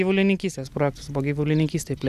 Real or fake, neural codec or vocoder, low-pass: real; none; 14.4 kHz